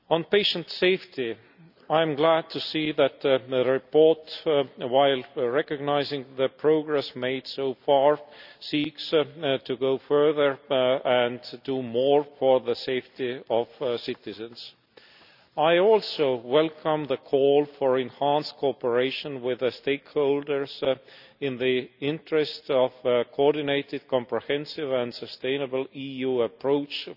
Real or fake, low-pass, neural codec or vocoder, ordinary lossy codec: real; 5.4 kHz; none; none